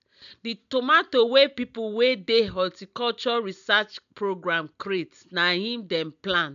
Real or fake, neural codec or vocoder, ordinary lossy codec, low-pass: real; none; none; 7.2 kHz